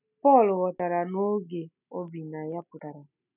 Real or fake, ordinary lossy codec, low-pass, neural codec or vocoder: real; none; 3.6 kHz; none